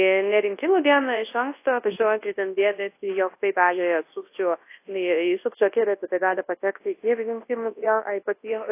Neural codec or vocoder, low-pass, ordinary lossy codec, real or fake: codec, 24 kHz, 0.9 kbps, WavTokenizer, large speech release; 3.6 kHz; AAC, 24 kbps; fake